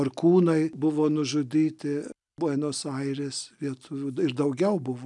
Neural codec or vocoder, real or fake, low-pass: none; real; 10.8 kHz